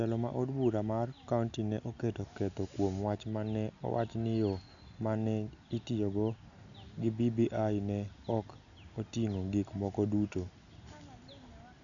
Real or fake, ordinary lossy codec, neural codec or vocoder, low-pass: real; none; none; 7.2 kHz